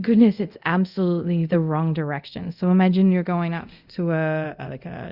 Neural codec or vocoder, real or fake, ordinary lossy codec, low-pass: codec, 24 kHz, 0.5 kbps, DualCodec; fake; Opus, 64 kbps; 5.4 kHz